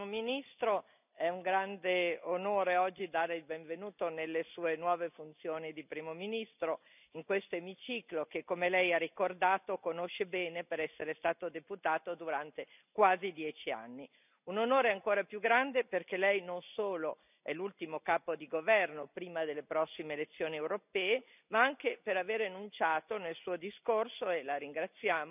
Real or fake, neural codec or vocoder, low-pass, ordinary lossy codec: real; none; 3.6 kHz; none